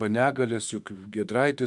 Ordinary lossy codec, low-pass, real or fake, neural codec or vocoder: MP3, 96 kbps; 10.8 kHz; fake; autoencoder, 48 kHz, 32 numbers a frame, DAC-VAE, trained on Japanese speech